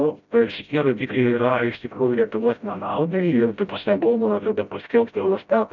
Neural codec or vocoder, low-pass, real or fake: codec, 16 kHz, 0.5 kbps, FreqCodec, smaller model; 7.2 kHz; fake